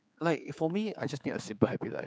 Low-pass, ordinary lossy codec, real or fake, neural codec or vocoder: none; none; fake; codec, 16 kHz, 4 kbps, X-Codec, HuBERT features, trained on general audio